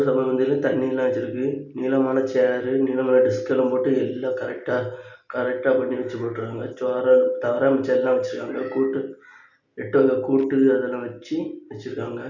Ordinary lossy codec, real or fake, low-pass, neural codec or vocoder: none; real; 7.2 kHz; none